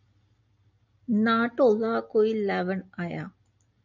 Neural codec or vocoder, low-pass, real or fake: none; 7.2 kHz; real